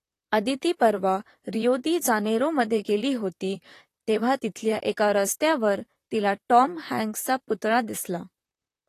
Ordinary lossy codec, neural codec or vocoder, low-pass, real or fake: AAC, 48 kbps; vocoder, 44.1 kHz, 128 mel bands, Pupu-Vocoder; 14.4 kHz; fake